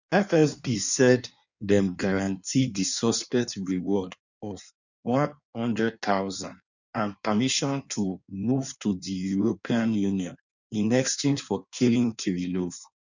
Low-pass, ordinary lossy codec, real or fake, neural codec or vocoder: 7.2 kHz; none; fake; codec, 16 kHz in and 24 kHz out, 1.1 kbps, FireRedTTS-2 codec